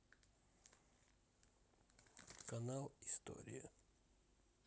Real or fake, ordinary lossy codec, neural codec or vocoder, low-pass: real; none; none; none